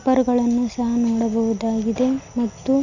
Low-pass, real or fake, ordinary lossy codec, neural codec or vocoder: 7.2 kHz; real; none; none